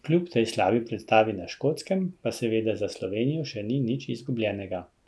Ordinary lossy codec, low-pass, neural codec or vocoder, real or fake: none; none; none; real